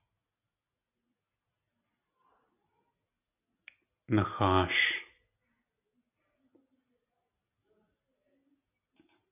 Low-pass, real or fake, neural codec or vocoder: 3.6 kHz; real; none